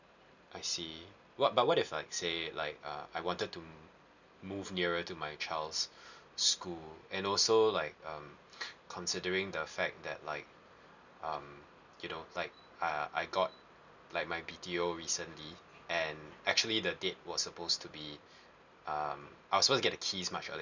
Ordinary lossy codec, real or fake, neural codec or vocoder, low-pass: none; real; none; 7.2 kHz